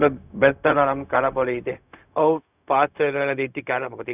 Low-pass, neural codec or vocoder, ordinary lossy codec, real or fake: 3.6 kHz; codec, 16 kHz, 0.4 kbps, LongCat-Audio-Codec; none; fake